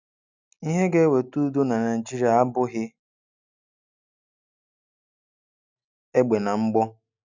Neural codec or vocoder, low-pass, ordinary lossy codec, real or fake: none; 7.2 kHz; none; real